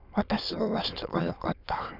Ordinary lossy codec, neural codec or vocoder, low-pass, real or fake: none; autoencoder, 22.05 kHz, a latent of 192 numbers a frame, VITS, trained on many speakers; 5.4 kHz; fake